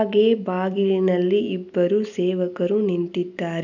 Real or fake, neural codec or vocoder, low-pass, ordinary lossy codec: real; none; 7.2 kHz; none